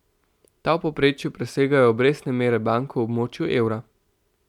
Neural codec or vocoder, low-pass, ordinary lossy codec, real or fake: none; 19.8 kHz; none; real